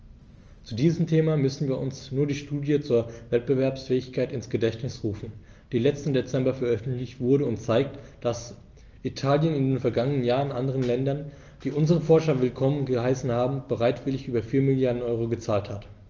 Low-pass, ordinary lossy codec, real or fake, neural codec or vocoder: 7.2 kHz; Opus, 24 kbps; real; none